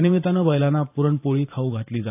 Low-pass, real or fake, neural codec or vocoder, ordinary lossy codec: 3.6 kHz; fake; vocoder, 44.1 kHz, 128 mel bands every 512 samples, BigVGAN v2; AAC, 32 kbps